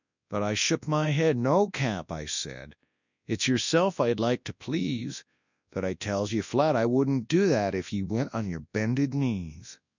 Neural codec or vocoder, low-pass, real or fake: codec, 24 kHz, 0.9 kbps, WavTokenizer, large speech release; 7.2 kHz; fake